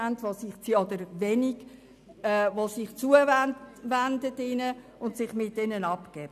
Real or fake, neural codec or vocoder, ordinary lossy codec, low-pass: real; none; none; 14.4 kHz